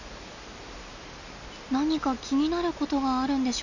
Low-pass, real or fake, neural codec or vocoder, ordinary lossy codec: 7.2 kHz; real; none; none